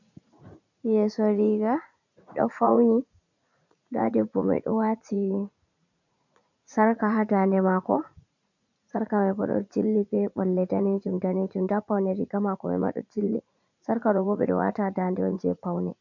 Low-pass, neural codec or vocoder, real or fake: 7.2 kHz; vocoder, 44.1 kHz, 80 mel bands, Vocos; fake